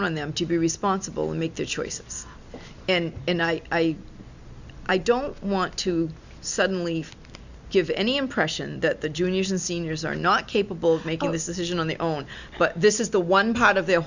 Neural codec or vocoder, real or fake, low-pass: none; real; 7.2 kHz